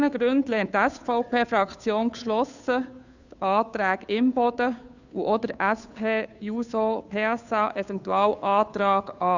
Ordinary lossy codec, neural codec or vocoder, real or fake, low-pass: none; codec, 16 kHz, 2 kbps, FunCodec, trained on Chinese and English, 25 frames a second; fake; 7.2 kHz